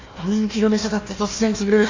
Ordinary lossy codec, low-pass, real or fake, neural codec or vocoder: AAC, 48 kbps; 7.2 kHz; fake; codec, 16 kHz, 1 kbps, FunCodec, trained on Chinese and English, 50 frames a second